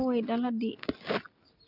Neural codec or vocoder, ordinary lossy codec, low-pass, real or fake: none; none; 5.4 kHz; real